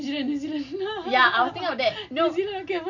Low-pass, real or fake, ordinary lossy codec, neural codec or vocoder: 7.2 kHz; real; none; none